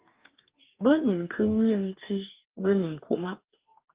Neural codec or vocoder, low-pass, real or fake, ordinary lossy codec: codec, 44.1 kHz, 2.6 kbps, DAC; 3.6 kHz; fake; Opus, 32 kbps